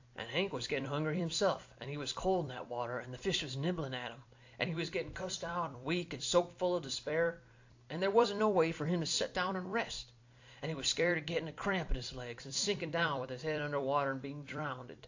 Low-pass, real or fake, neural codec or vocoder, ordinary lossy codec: 7.2 kHz; fake; vocoder, 44.1 kHz, 128 mel bands every 256 samples, BigVGAN v2; AAC, 48 kbps